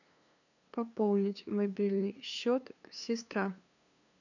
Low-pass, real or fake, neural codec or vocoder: 7.2 kHz; fake; codec, 16 kHz, 2 kbps, FunCodec, trained on LibriTTS, 25 frames a second